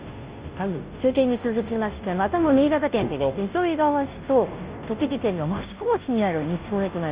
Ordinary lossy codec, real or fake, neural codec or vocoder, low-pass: Opus, 24 kbps; fake; codec, 16 kHz, 0.5 kbps, FunCodec, trained on Chinese and English, 25 frames a second; 3.6 kHz